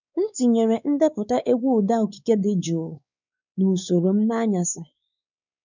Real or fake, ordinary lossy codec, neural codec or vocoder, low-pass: fake; none; codec, 16 kHz, 4 kbps, X-Codec, WavLM features, trained on Multilingual LibriSpeech; 7.2 kHz